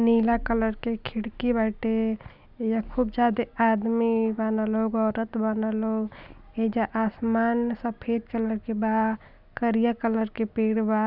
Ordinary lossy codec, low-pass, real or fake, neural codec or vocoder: none; 5.4 kHz; real; none